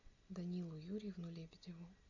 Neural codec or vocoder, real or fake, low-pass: none; real; 7.2 kHz